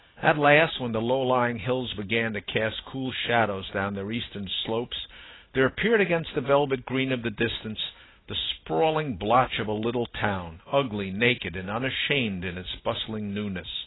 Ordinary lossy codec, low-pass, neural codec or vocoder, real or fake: AAC, 16 kbps; 7.2 kHz; none; real